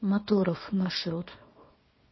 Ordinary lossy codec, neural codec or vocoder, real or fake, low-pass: MP3, 24 kbps; codec, 24 kHz, 0.9 kbps, WavTokenizer, small release; fake; 7.2 kHz